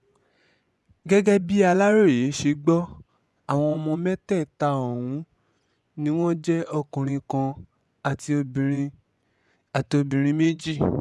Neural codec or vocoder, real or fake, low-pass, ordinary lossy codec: vocoder, 24 kHz, 100 mel bands, Vocos; fake; none; none